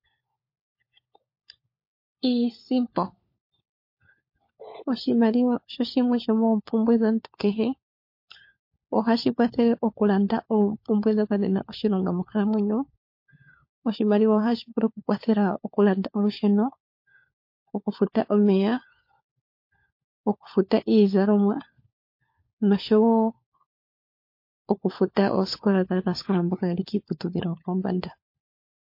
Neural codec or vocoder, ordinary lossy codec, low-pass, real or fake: codec, 16 kHz, 4 kbps, FunCodec, trained on LibriTTS, 50 frames a second; MP3, 32 kbps; 5.4 kHz; fake